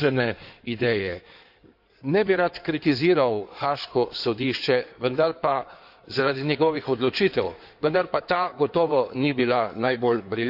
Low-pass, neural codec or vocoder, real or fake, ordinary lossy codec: 5.4 kHz; codec, 16 kHz in and 24 kHz out, 2.2 kbps, FireRedTTS-2 codec; fake; none